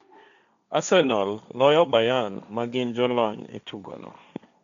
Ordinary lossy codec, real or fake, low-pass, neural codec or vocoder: none; fake; 7.2 kHz; codec, 16 kHz, 1.1 kbps, Voila-Tokenizer